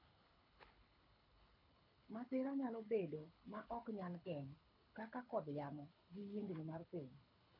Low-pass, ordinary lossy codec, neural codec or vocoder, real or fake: 5.4 kHz; none; codec, 24 kHz, 6 kbps, HILCodec; fake